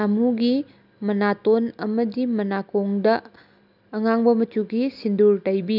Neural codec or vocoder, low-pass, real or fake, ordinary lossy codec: none; 5.4 kHz; real; none